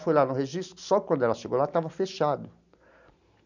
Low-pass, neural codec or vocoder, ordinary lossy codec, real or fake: 7.2 kHz; none; none; real